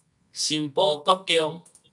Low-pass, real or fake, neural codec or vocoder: 10.8 kHz; fake; codec, 24 kHz, 0.9 kbps, WavTokenizer, medium music audio release